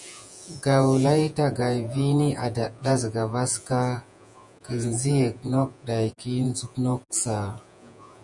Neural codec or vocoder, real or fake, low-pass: vocoder, 48 kHz, 128 mel bands, Vocos; fake; 10.8 kHz